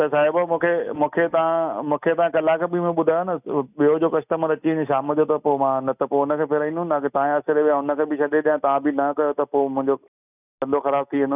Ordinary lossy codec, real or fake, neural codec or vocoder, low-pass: none; real; none; 3.6 kHz